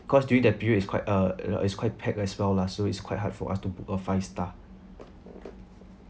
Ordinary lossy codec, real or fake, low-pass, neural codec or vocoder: none; real; none; none